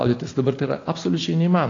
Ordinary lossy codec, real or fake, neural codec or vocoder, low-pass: AAC, 32 kbps; real; none; 7.2 kHz